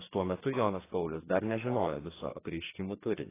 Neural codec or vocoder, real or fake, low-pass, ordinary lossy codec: codec, 44.1 kHz, 2.6 kbps, SNAC; fake; 3.6 kHz; AAC, 16 kbps